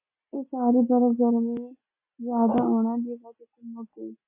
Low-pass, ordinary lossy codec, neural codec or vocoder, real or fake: 3.6 kHz; MP3, 24 kbps; none; real